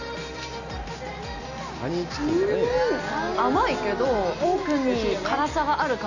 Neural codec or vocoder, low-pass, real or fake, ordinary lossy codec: none; 7.2 kHz; real; none